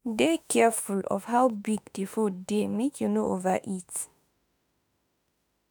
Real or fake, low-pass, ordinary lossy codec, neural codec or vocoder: fake; none; none; autoencoder, 48 kHz, 32 numbers a frame, DAC-VAE, trained on Japanese speech